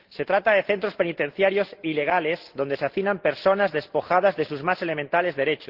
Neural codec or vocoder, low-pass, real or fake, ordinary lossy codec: none; 5.4 kHz; real; Opus, 16 kbps